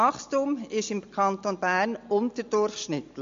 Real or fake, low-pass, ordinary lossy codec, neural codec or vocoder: real; 7.2 kHz; MP3, 48 kbps; none